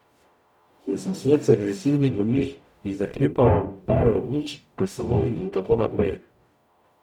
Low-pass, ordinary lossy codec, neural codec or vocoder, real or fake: 19.8 kHz; none; codec, 44.1 kHz, 0.9 kbps, DAC; fake